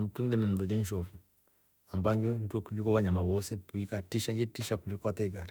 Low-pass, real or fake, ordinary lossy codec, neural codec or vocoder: none; fake; none; autoencoder, 48 kHz, 32 numbers a frame, DAC-VAE, trained on Japanese speech